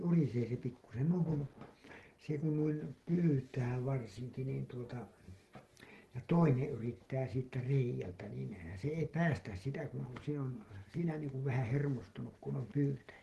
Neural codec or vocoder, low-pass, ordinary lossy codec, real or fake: none; 14.4 kHz; Opus, 16 kbps; real